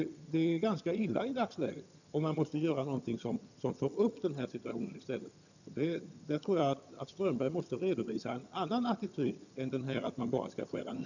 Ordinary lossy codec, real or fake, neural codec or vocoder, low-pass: none; fake; vocoder, 22.05 kHz, 80 mel bands, HiFi-GAN; 7.2 kHz